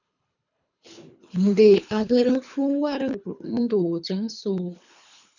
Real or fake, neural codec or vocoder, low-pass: fake; codec, 24 kHz, 3 kbps, HILCodec; 7.2 kHz